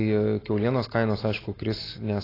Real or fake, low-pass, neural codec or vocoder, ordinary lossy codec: real; 5.4 kHz; none; AAC, 24 kbps